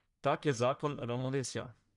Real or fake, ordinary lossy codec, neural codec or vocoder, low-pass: fake; none; codec, 44.1 kHz, 1.7 kbps, Pupu-Codec; 10.8 kHz